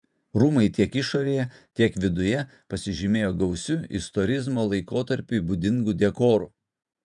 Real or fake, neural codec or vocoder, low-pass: fake; vocoder, 48 kHz, 128 mel bands, Vocos; 10.8 kHz